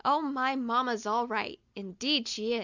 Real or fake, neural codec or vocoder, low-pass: real; none; 7.2 kHz